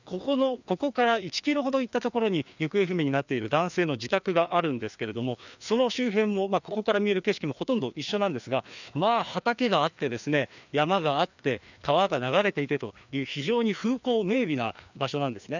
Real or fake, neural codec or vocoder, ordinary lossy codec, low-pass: fake; codec, 16 kHz, 2 kbps, FreqCodec, larger model; none; 7.2 kHz